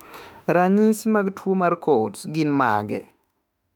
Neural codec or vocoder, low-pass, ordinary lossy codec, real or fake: autoencoder, 48 kHz, 32 numbers a frame, DAC-VAE, trained on Japanese speech; 19.8 kHz; none; fake